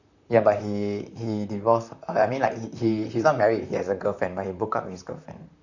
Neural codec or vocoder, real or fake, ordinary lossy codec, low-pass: vocoder, 44.1 kHz, 128 mel bands, Pupu-Vocoder; fake; none; 7.2 kHz